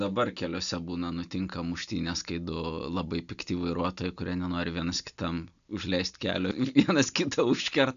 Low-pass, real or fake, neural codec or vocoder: 7.2 kHz; real; none